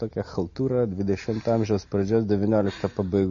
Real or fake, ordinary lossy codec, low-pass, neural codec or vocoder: real; MP3, 32 kbps; 7.2 kHz; none